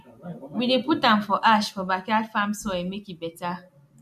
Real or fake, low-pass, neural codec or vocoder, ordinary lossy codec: real; 14.4 kHz; none; MP3, 64 kbps